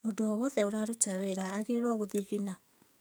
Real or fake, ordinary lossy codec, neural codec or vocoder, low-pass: fake; none; codec, 44.1 kHz, 2.6 kbps, SNAC; none